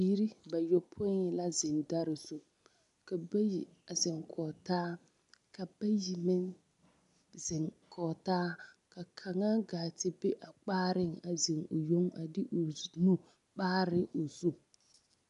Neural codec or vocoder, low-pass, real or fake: none; 10.8 kHz; real